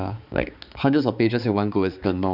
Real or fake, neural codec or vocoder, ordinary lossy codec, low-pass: fake; codec, 16 kHz, 4 kbps, X-Codec, HuBERT features, trained on balanced general audio; none; 5.4 kHz